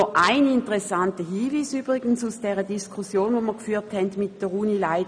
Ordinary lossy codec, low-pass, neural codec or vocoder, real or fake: none; 9.9 kHz; none; real